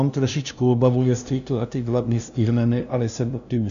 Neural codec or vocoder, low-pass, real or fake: codec, 16 kHz, 0.5 kbps, FunCodec, trained on LibriTTS, 25 frames a second; 7.2 kHz; fake